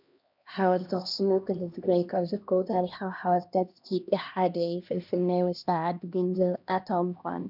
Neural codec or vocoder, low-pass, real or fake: codec, 16 kHz, 1 kbps, X-Codec, HuBERT features, trained on LibriSpeech; 5.4 kHz; fake